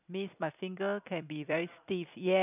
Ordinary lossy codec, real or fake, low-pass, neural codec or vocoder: none; fake; 3.6 kHz; vocoder, 44.1 kHz, 128 mel bands every 256 samples, BigVGAN v2